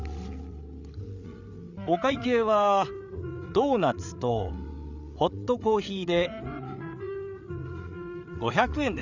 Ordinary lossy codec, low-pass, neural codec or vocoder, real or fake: none; 7.2 kHz; codec, 16 kHz, 8 kbps, FreqCodec, larger model; fake